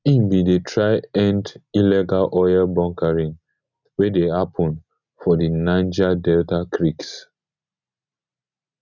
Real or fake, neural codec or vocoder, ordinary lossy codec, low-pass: real; none; none; 7.2 kHz